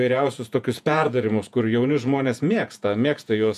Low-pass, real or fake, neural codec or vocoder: 14.4 kHz; fake; vocoder, 48 kHz, 128 mel bands, Vocos